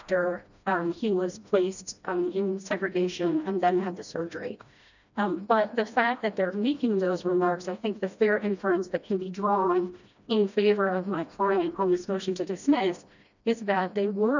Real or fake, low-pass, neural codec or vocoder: fake; 7.2 kHz; codec, 16 kHz, 1 kbps, FreqCodec, smaller model